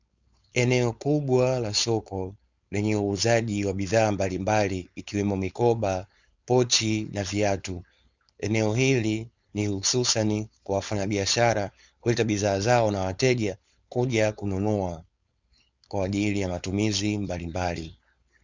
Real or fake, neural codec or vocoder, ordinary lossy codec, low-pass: fake; codec, 16 kHz, 4.8 kbps, FACodec; Opus, 64 kbps; 7.2 kHz